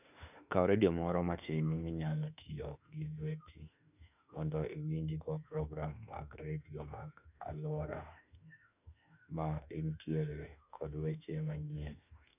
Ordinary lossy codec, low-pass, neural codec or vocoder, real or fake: none; 3.6 kHz; autoencoder, 48 kHz, 32 numbers a frame, DAC-VAE, trained on Japanese speech; fake